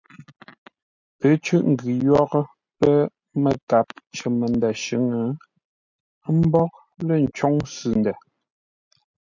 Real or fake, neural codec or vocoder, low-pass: real; none; 7.2 kHz